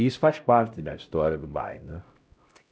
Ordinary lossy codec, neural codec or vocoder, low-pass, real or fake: none; codec, 16 kHz, 0.5 kbps, X-Codec, HuBERT features, trained on LibriSpeech; none; fake